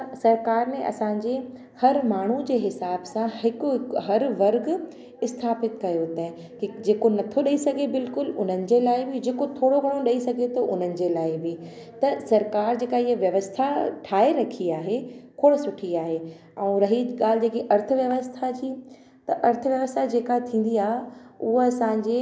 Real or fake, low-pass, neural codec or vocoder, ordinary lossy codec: real; none; none; none